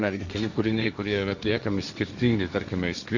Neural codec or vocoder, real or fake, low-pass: codec, 16 kHz, 1.1 kbps, Voila-Tokenizer; fake; 7.2 kHz